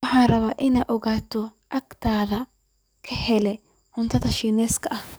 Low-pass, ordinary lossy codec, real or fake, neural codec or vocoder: none; none; fake; codec, 44.1 kHz, 7.8 kbps, DAC